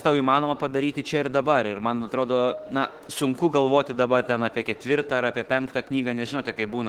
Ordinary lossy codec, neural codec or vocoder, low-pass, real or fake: Opus, 16 kbps; autoencoder, 48 kHz, 32 numbers a frame, DAC-VAE, trained on Japanese speech; 19.8 kHz; fake